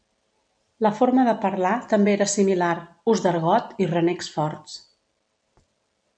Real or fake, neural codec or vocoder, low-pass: real; none; 9.9 kHz